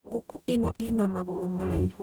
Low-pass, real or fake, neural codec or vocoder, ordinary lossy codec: none; fake; codec, 44.1 kHz, 0.9 kbps, DAC; none